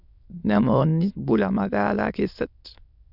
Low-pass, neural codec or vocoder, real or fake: 5.4 kHz; autoencoder, 22.05 kHz, a latent of 192 numbers a frame, VITS, trained on many speakers; fake